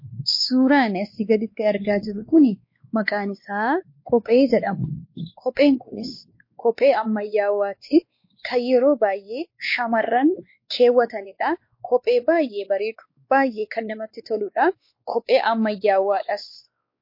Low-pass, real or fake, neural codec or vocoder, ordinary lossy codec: 5.4 kHz; fake; codec, 16 kHz, 2 kbps, X-Codec, WavLM features, trained on Multilingual LibriSpeech; MP3, 32 kbps